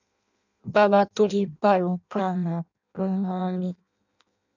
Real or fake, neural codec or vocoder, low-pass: fake; codec, 16 kHz in and 24 kHz out, 0.6 kbps, FireRedTTS-2 codec; 7.2 kHz